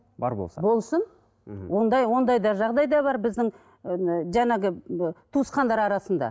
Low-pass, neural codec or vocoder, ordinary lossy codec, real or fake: none; none; none; real